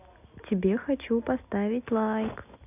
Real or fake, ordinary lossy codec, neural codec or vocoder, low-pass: real; none; none; 3.6 kHz